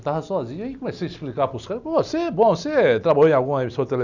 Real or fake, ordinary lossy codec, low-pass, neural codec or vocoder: real; none; 7.2 kHz; none